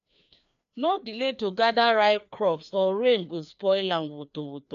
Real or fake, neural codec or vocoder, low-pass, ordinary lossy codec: fake; codec, 16 kHz, 2 kbps, FreqCodec, larger model; 7.2 kHz; none